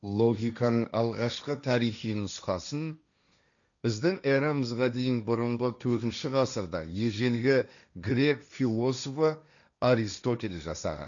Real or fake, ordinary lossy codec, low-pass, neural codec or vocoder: fake; none; 7.2 kHz; codec, 16 kHz, 1.1 kbps, Voila-Tokenizer